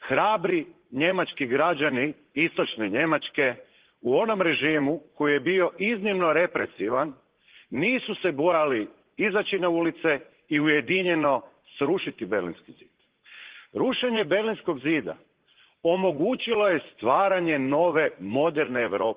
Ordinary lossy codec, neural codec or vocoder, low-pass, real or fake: Opus, 16 kbps; vocoder, 44.1 kHz, 80 mel bands, Vocos; 3.6 kHz; fake